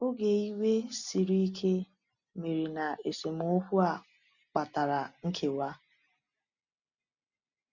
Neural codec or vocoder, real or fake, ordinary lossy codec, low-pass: none; real; none; 7.2 kHz